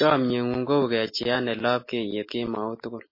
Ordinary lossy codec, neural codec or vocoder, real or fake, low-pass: MP3, 24 kbps; none; real; 5.4 kHz